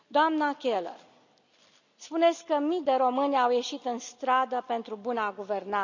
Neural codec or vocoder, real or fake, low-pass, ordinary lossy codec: none; real; 7.2 kHz; none